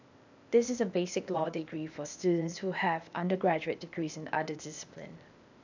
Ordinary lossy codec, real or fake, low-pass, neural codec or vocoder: none; fake; 7.2 kHz; codec, 16 kHz, 0.8 kbps, ZipCodec